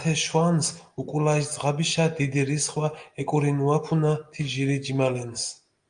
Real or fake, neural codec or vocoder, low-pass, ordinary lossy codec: real; none; 9.9 kHz; Opus, 32 kbps